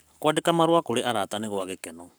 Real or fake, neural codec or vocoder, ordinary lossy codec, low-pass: fake; codec, 44.1 kHz, 7.8 kbps, Pupu-Codec; none; none